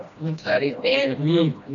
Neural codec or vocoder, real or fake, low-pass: codec, 16 kHz, 1 kbps, FreqCodec, smaller model; fake; 7.2 kHz